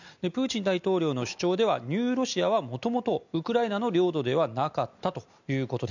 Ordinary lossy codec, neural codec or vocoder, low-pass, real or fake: none; none; 7.2 kHz; real